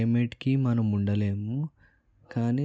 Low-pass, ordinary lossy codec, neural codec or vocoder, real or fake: none; none; none; real